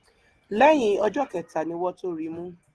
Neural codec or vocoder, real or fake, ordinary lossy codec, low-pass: none; real; Opus, 16 kbps; 10.8 kHz